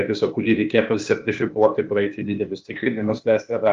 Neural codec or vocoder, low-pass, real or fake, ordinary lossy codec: codec, 16 kHz, 0.8 kbps, ZipCodec; 7.2 kHz; fake; Opus, 32 kbps